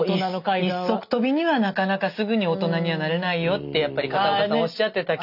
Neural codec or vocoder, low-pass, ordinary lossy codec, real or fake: none; 5.4 kHz; none; real